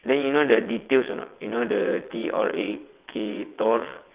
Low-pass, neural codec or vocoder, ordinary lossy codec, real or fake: 3.6 kHz; vocoder, 22.05 kHz, 80 mel bands, WaveNeXt; Opus, 24 kbps; fake